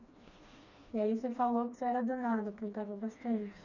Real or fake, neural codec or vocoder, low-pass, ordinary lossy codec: fake; codec, 16 kHz, 2 kbps, FreqCodec, smaller model; 7.2 kHz; none